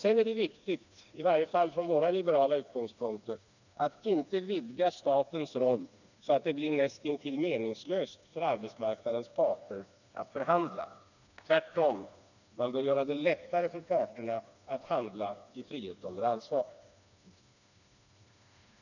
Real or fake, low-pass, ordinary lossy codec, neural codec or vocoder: fake; 7.2 kHz; none; codec, 16 kHz, 2 kbps, FreqCodec, smaller model